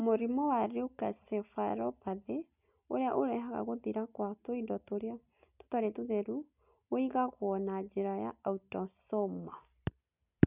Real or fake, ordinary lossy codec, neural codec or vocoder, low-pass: real; none; none; 3.6 kHz